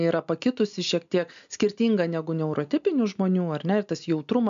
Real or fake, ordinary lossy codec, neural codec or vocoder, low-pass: real; MP3, 64 kbps; none; 7.2 kHz